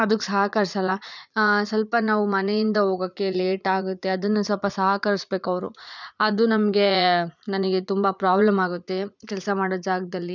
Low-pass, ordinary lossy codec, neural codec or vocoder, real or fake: 7.2 kHz; none; vocoder, 44.1 kHz, 80 mel bands, Vocos; fake